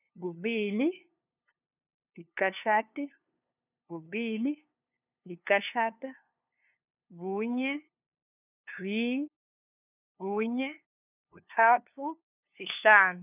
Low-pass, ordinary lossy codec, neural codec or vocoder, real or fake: 3.6 kHz; none; codec, 16 kHz, 2 kbps, FunCodec, trained on LibriTTS, 25 frames a second; fake